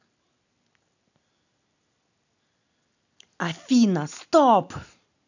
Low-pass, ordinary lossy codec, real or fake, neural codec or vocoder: 7.2 kHz; none; real; none